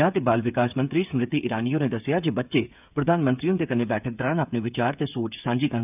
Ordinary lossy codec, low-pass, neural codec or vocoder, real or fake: none; 3.6 kHz; codec, 16 kHz, 8 kbps, FreqCodec, smaller model; fake